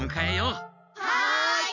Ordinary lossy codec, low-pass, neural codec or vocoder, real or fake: none; 7.2 kHz; none; real